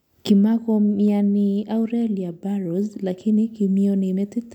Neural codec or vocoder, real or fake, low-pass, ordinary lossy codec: none; real; 19.8 kHz; none